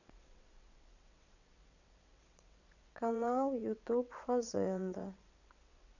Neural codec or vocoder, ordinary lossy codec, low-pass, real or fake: vocoder, 22.05 kHz, 80 mel bands, WaveNeXt; none; 7.2 kHz; fake